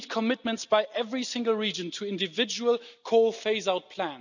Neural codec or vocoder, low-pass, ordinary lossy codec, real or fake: none; 7.2 kHz; none; real